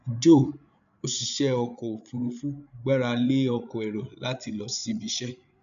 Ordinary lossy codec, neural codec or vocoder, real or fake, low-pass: none; codec, 16 kHz, 8 kbps, FreqCodec, larger model; fake; 7.2 kHz